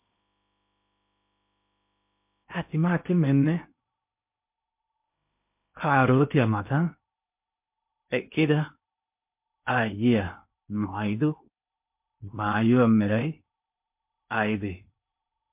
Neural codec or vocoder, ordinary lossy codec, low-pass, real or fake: codec, 16 kHz in and 24 kHz out, 0.8 kbps, FocalCodec, streaming, 65536 codes; MP3, 32 kbps; 3.6 kHz; fake